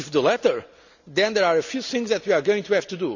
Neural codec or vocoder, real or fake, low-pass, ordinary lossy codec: none; real; 7.2 kHz; none